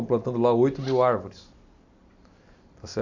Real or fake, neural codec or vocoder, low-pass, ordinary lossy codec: real; none; 7.2 kHz; AAC, 48 kbps